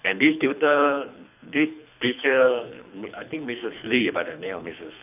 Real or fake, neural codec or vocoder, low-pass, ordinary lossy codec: fake; codec, 24 kHz, 3 kbps, HILCodec; 3.6 kHz; none